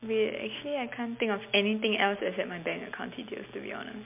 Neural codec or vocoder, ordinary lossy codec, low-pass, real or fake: none; MP3, 32 kbps; 3.6 kHz; real